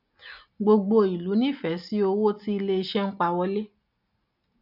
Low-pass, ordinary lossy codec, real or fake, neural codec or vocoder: 5.4 kHz; none; real; none